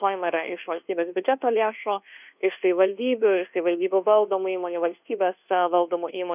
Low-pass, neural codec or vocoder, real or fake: 3.6 kHz; codec, 24 kHz, 1.2 kbps, DualCodec; fake